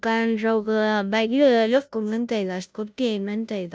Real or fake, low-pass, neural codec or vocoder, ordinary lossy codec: fake; none; codec, 16 kHz, 0.5 kbps, FunCodec, trained on Chinese and English, 25 frames a second; none